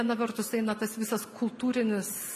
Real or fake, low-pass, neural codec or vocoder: real; 14.4 kHz; none